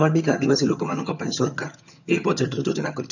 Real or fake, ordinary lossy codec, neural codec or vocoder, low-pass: fake; none; vocoder, 22.05 kHz, 80 mel bands, HiFi-GAN; 7.2 kHz